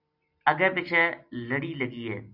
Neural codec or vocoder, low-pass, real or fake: none; 5.4 kHz; real